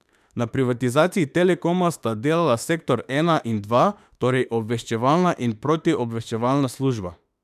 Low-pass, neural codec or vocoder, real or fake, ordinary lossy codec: 14.4 kHz; autoencoder, 48 kHz, 32 numbers a frame, DAC-VAE, trained on Japanese speech; fake; none